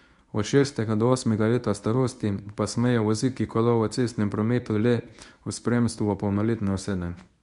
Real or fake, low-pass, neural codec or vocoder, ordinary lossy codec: fake; 10.8 kHz; codec, 24 kHz, 0.9 kbps, WavTokenizer, medium speech release version 1; none